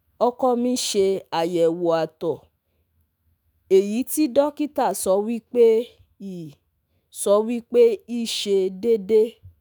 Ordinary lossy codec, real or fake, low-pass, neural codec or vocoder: none; fake; none; autoencoder, 48 kHz, 128 numbers a frame, DAC-VAE, trained on Japanese speech